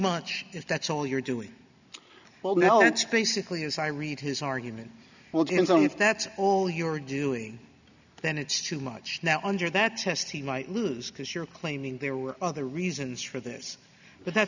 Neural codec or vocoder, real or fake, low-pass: none; real; 7.2 kHz